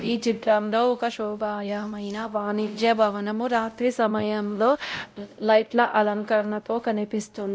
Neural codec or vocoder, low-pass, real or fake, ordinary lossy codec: codec, 16 kHz, 0.5 kbps, X-Codec, WavLM features, trained on Multilingual LibriSpeech; none; fake; none